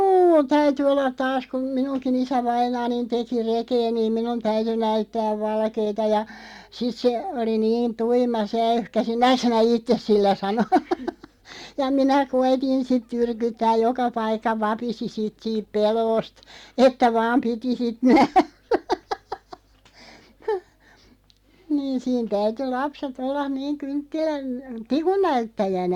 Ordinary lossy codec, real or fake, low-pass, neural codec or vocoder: Opus, 64 kbps; real; 19.8 kHz; none